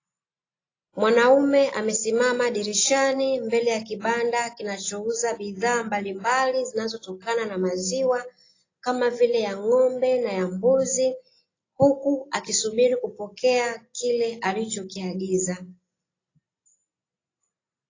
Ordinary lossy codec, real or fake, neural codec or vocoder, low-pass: AAC, 32 kbps; real; none; 7.2 kHz